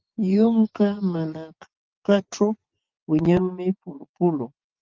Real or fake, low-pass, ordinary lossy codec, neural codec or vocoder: fake; 7.2 kHz; Opus, 32 kbps; vocoder, 24 kHz, 100 mel bands, Vocos